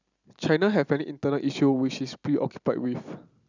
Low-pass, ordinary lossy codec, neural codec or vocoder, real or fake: 7.2 kHz; none; none; real